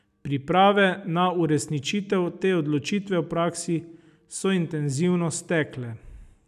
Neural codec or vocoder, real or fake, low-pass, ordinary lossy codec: none; real; 14.4 kHz; none